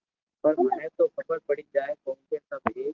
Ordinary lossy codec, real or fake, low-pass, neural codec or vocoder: Opus, 16 kbps; real; 7.2 kHz; none